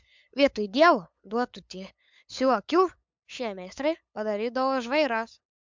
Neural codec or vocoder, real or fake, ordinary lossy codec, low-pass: codec, 16 kHz, 8 kbps, FunCodec, trained on LibriTTS, 25 frames a second; fake; MP3, 64 kbps; 7.2 kHz